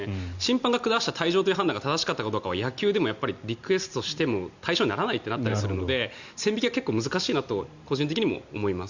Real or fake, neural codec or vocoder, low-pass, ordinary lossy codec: real; none; 7.2 kHz; Opus, 64 kbps